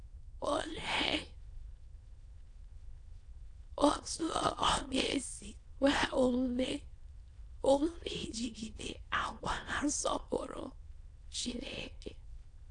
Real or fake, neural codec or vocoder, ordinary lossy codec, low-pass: fake; autoencoder, 22.05 kHz, a latent of 192 numbers a frame, VITS, trained on many speakers; AAC, 48 kbps; 9.9 kHz